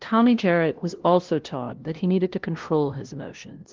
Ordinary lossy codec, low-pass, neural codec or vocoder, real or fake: Opus, 16 kbps; 7.2 kHz; codec, 16 kHz, 0.5 kbps, FunCodec, trained on LibriTTS, 25 frames a second; fake